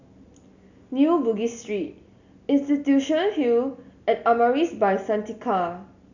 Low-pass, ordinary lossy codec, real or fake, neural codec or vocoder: 7.2 kHz; none; real; none